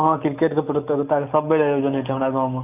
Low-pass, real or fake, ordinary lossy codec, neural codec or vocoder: 3.6 kHz; real; none; none